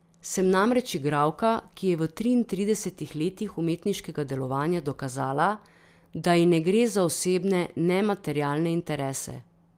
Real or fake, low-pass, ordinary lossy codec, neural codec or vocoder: real; 14.4 kHz; Opus, 32 kbps; none